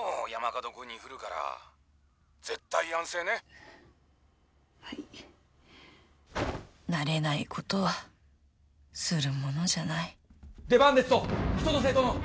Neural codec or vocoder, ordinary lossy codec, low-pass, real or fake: none; none; none; real